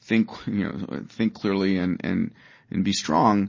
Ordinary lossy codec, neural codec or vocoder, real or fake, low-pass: MP3, 32 kbps; none; real; 7.2 kHz